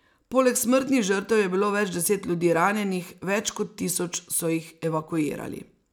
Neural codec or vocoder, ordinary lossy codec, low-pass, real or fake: none; none; none; real